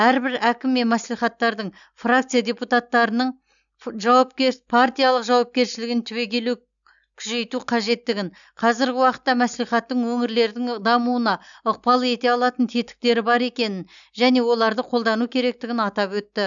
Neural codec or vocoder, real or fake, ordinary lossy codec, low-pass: none; real; none; 7.2 kHz